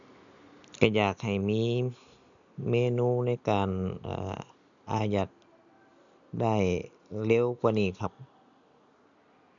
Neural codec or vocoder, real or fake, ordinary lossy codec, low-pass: none; real; none; 7.2 kHz